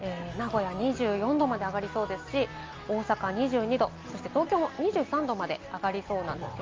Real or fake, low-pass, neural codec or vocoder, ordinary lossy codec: real; 7.2 kHz; none; Opus, 24 kbps